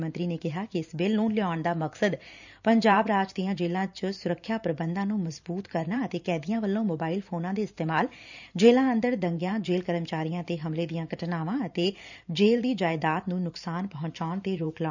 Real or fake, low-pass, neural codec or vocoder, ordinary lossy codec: fake; 7.2 kHz; vocoder, 44.1 kHz, 128 mel bands every 512 samples, BigVGAN v2; none